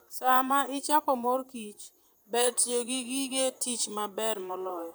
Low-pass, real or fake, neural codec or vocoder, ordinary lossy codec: none; fake; vocoder, 44.1 kHz, 128 mel bands, Pupu-Vocoder; none